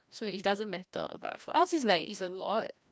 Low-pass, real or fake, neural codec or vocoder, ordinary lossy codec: none; fake; codec, 16 kHz, 1 kbps, FreqCodec, larger model; none